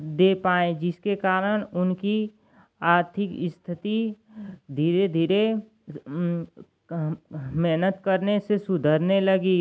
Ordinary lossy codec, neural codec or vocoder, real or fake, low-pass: none; none; real; none